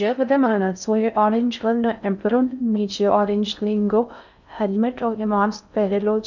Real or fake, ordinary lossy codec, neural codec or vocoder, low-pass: fake; none; codec, 16 kHz in and 24 kHz out, 0.6 kbps, FocalCodec, streaming, 4096 codes; 7.2 kHz